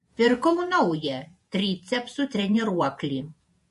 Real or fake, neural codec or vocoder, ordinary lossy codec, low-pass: fake; vocoder, 48 kHz, 128 mel bands, Vocos; MP3, 48 kbps; 14.4 kHz